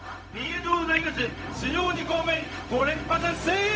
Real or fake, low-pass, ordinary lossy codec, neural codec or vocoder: fake; none; none; codec, 16 kHz, 0.4 kbps, LongCat-Audio-Codec